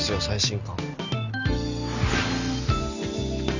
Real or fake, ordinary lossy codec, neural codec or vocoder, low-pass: real; Opus, 64 kbps; none; 7.2 kHz